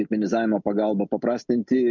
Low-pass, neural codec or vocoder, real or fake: 7.2 kHz; none; real